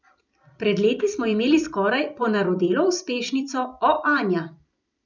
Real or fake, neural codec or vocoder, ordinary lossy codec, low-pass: fake; vocoder, 44.1 kHz, 128 mel bands every 256 samples, BigVGAN v2; none; 7.2 kHz